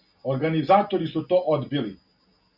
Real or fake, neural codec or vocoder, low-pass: real; none; 5.4 kHz